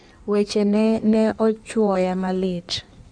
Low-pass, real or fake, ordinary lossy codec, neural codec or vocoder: 9.9 kHz; fake; none; codec, 16 kHz in and 24 kHz out, 1.1 kbps, FireRedTTS-2 codec